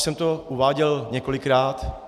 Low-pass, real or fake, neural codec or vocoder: 14.4 kHz; real; none